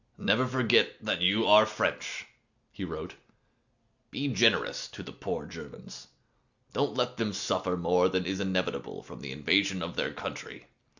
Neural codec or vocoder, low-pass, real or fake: none; 7.2 kHz; real